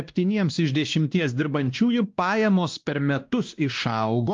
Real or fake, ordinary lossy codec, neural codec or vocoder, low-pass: fake; Opus, 32 kbps; codec, 16 kHz, 1 kbps, X-Codec, WavLM features, trained on Multilingual LibriSpeech; 7.2 kHz